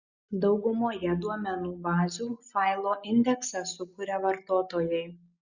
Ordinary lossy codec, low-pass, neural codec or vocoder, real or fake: Opus, 64 kbps; 7.2 kHz; none; real